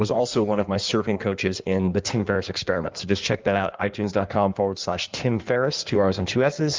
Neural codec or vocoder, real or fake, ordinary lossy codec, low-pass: codec, 16 kHz in and 24 kHz out, 1.1 kbps, FireRedTTS-2 codec; fake; Opus, 32 kbps; 7.2 kHz